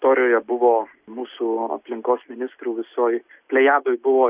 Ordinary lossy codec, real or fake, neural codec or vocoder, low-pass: Opus, 64 kbps; real; none; 3.6 kHz